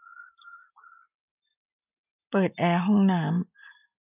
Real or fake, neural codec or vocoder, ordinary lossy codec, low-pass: real; none; none; 3.6 kHz